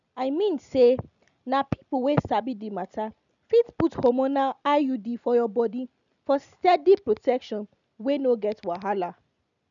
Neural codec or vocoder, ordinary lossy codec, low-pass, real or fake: none; none; 7.2 kHz; real